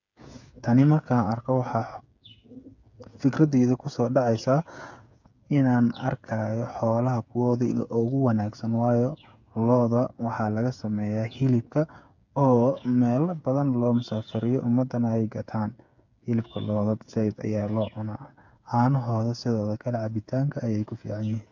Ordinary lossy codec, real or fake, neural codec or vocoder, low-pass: none; fake; codec, 16 kHz, 8 kbps, FreqCodec, smaller model; 7.2 kHz